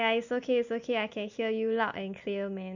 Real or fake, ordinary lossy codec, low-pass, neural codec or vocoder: real; none; 7.2 kHz; none